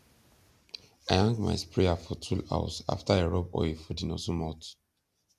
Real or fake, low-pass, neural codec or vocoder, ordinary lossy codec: real; 14.4 kHz; none; none